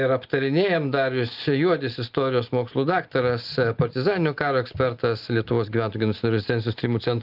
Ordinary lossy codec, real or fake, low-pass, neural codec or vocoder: Opus, 24 kbps; real; 5.4 kHz; none